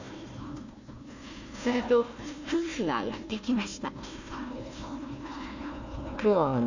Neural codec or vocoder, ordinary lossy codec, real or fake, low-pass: codec, 16 kHz, 1 kbps, FunCodec, trained on Chinese and English, 50 frames a second; none; fake; 7.2 kHz